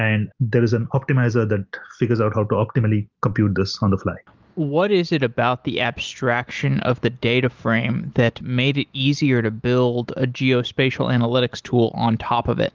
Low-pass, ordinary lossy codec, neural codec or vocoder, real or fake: 7.2 kHz; Opus, 32 kbps; none; real